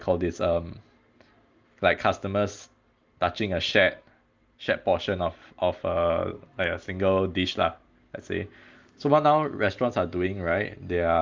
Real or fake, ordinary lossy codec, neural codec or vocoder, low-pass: real; Opus, 32 kbps; none; 7.2 kHz